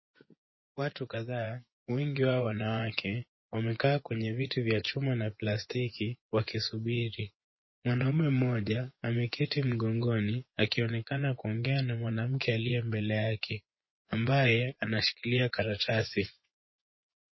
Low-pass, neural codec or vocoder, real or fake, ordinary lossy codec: 7.2 kHz; vocoder, 24 kHz, 100 mel bands, Vocos; fake; MP3, 24 kbps